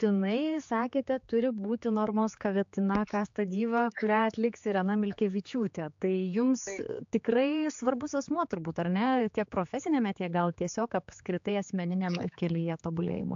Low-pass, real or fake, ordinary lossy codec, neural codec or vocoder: 7.2 kHz; fake; AAC, 64 kbps; codec, 16 kHz, 4 kbps, X-Codec, HuBERT features, trained on general audio